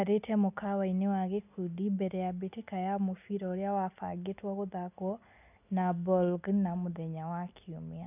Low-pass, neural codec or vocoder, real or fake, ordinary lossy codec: 3.6 kHz; none; real; none